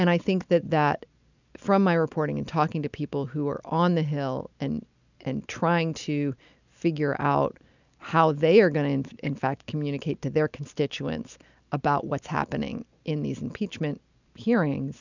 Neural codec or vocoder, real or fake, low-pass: none; real; 7.2 kHz